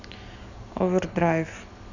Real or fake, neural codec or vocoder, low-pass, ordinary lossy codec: real; none; 7.2 kHz; none